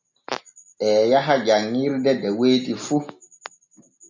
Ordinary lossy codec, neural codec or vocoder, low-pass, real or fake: MP3, 64 kbps; none; 7.2 kHz; real